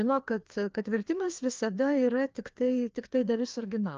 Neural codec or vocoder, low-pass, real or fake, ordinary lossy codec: codec, 16 kHz, 2 kbps, FreqCodec, larger model; 7.2 kHz; fake; Opus, 24 kbps